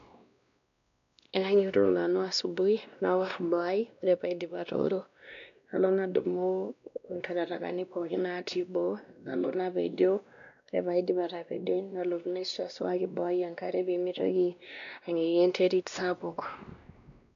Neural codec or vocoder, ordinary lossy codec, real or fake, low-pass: codec, 16 kHz, 1 kbps, X-Codec, WavLM features, trained on Multilingual LibriSpeech; none; fake; 7.2 kHz